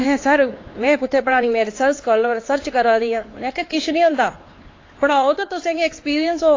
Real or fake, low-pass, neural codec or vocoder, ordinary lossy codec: fake; 7.2 kHz; codec, 16 kHz, 4 kbps, X-Codec, HuBERT features, trained on LibriSpeech; AAC, 32 kbps